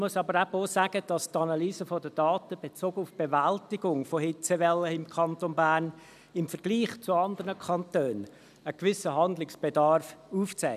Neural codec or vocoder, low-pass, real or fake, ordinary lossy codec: none; 14.4 kHz; real; none